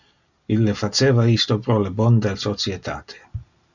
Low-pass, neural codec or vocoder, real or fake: 7.2 kHz; none; real